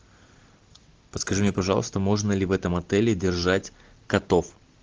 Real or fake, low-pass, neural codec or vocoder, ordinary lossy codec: real; 7.2 kHz; none; Opus, 16 kbps